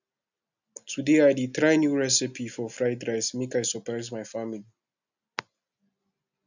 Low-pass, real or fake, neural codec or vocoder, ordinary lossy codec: 7.2 kHz; real; none; none